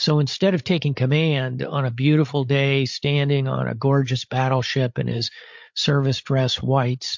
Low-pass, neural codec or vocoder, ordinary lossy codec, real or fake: 7.2 kHz; codec, 16 kHz, 8 kbps, FreqCodec, larger model; MP3, 48 kbps; fake